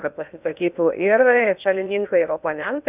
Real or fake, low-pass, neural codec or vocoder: fake; 3.6 kHz; codec, 16 kHz in and 24 kHz out, 0.6 kbps, FocalCodec, streaming, 2048 codes